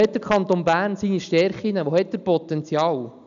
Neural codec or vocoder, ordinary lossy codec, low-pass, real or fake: none; none; 7.2 kHz; real